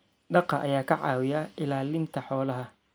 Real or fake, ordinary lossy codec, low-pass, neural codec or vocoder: real; none; none; none